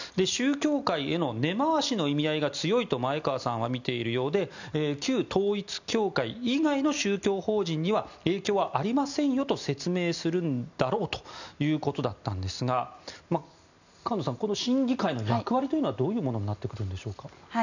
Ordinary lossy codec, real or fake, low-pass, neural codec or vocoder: none; real; 7.2 kHz; none